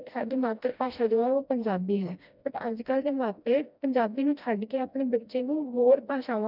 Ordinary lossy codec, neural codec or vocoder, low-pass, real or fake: none; codec, 16 kHz, 1 kbps, FreqCodec, smaller model; 5.4 kHz; fake